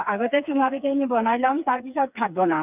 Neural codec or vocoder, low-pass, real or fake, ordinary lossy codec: codec, 16 kHz, 4 kbps, FreqCodec, smaller model; 3.6 kHz; fake; none